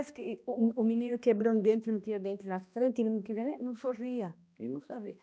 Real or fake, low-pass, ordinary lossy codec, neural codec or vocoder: fake; none; none; codec, 16 kHz, 1 kbps, X-Codec, HuBERT features, trained on balanced general audio